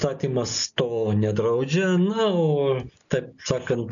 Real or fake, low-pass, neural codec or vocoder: real; 7.2 kHz; none